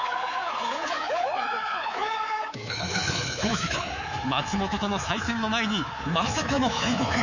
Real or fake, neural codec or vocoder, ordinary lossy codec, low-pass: fake; codec, 24 kHz, 3.1 kbps, DualCodec; none; 7.2 kHz